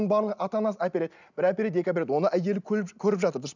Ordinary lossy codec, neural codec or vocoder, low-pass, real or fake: none; none; 7.2 kHz; real